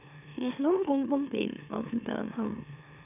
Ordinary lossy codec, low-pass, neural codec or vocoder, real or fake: none; 3.6 kHz; autoencoder, 44.1 kHz, a latent of 192 numbers a frame, MeloTTS; fake